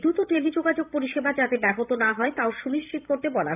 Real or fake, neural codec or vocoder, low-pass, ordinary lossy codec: fake; vocoder, 44.1 kHz, 128 mel bands every 512 samples, BigVGAN v2; 3.6 kHz; AAC, 32 kbps